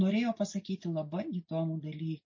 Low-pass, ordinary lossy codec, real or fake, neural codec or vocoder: 7.2 kHz; MP3, 32 kbps; real; none